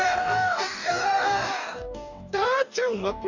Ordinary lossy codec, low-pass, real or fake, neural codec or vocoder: none; 7.2 kHz; fake; codec, 44.1 kHz, 2.6 kbps, DAC